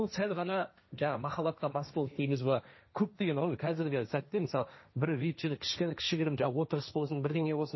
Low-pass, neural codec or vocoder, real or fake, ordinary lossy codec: 7.2 kHz; codec, 16 kHz, 1.1 kbps, Voila-Tokenizer; fake; MP3, 24 kbps